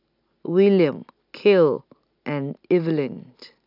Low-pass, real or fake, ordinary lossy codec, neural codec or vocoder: 5.4 kHz; real; none; none